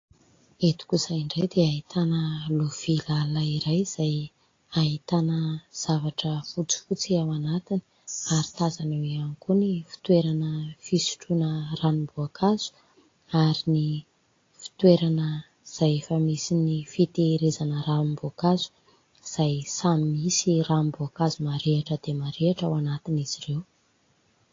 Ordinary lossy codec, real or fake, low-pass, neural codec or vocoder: AAC, 32 kbps; real; 7.2 kHz; none